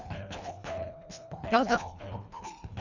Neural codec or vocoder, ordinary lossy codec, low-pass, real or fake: codec, 24 kHz, 1.5 kbps, HILCodec; none; 7.2 kHz; fake